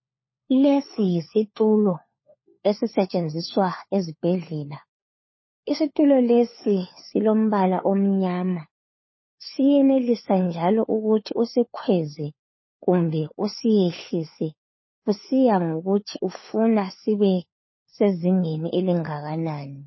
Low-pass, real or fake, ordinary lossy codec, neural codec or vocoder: 7.2 kHz; fake; MP3, 24 kbps; codec, 16 kHz, 4 kbps, FunCodec, trained on LibriTTS, 50 frames a second